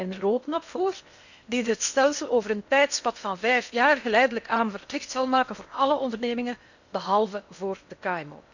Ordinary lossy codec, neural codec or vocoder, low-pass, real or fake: none; codec, 16 kHz in and 24 kHz out, 0.8 kbps, FocalCodec, streaming, 65536 codes; 7.2 kHz; fake